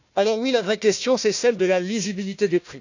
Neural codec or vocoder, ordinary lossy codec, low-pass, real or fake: codec, 16 kHz, 1 kbps, FunCodec, trained on Chinese and English, 50 frames a second; none; 7.2 kHz; fake